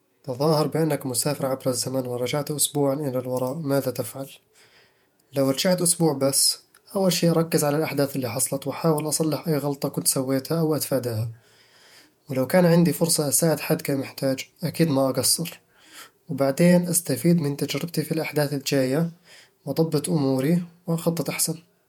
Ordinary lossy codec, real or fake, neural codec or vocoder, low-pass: MP3, 96 kbps; fake; vocoder, 48 kHz, 128 mel bands, Vocos; 19.8 kHz